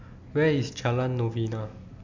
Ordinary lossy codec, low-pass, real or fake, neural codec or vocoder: MP3, 64 kbps; 7.2 kHz; real; none